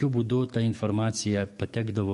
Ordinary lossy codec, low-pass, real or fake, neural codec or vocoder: MP3, 48 kbps; 14.4 kHz; fake; codec, 44.1 kHz, 7.8 kbps, DAC